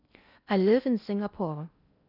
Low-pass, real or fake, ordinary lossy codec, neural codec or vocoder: 5.4 kHz; fake; none; codec, 16 kHz in and 24 kHz out, 0.8 kbps, FocalCodec, streaming, 65536 codes